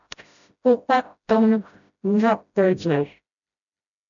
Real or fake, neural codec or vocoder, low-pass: fake; codec, 16 kHz, 0.5 kbps, FreqCodec, smaller model; 7.2 kHz